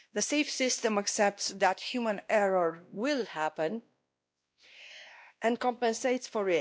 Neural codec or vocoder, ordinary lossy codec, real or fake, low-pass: codec, 16 kHz, 1 kbps, X-Codec, WavLM features, trained on Multilingual LibriSpeech; none; fake; none